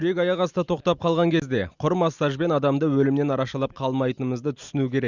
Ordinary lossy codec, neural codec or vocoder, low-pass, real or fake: Opus, 64 kbps; none; 7.2 kHz; real